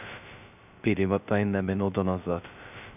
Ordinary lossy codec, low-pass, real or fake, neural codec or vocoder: none; 3.6 kHz; fake; codec, 16 kHz, 0.2 kbps, FocalCodec